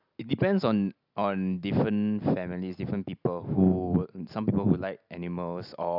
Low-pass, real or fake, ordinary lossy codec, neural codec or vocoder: 5.4 kHz; real; none; none